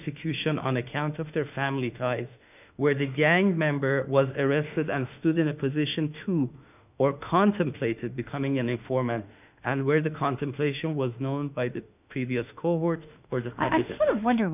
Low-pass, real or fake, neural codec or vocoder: 3.6 kHz; fake; autoencoder, 48 kHz, 32 numbers a frame, DAC-VAE, trained on Japanese speech